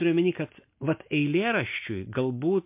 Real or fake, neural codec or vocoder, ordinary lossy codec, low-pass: real; none; MP3, 32 kbps; 3.6 kHz